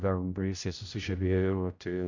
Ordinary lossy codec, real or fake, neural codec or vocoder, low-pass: Opus, 64 kbps; fake; codec, 16 kHz, 0.5 kbps, X-Codec, HuBERT features, trained on general audio; 7.2 kHz